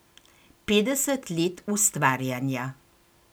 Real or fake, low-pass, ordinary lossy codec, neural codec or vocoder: real; none; none; none